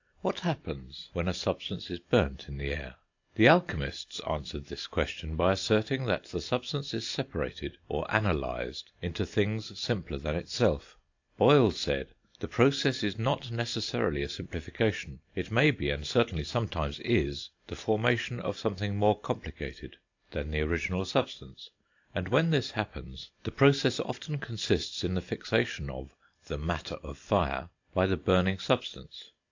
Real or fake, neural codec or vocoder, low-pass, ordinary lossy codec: real; none; 7.2 kHz; AAC, 48 kbps